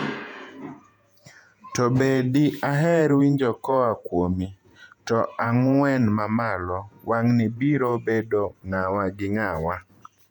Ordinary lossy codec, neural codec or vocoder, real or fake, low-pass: none; vocoder, 48 kHz, 128 mel bands, Vocos; fake; 19.8 kHz